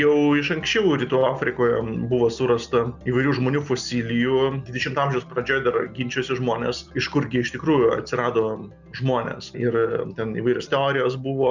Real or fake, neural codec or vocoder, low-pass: real; none; 7.2 kHz